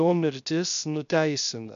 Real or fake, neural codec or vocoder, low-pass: fake; codec, 16 kHz, 0.3 kbps, FocalCodec; 7.2 kHz